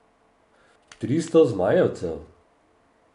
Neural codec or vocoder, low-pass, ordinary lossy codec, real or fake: none; 10.8 kHz; none; real